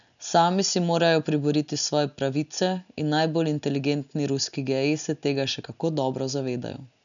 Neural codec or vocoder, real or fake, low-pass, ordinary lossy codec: none; real; 7.2 kHz; none